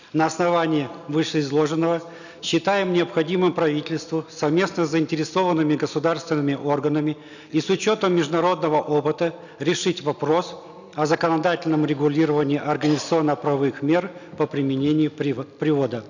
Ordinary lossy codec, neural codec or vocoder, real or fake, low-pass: none; none; real; 7.2 kHz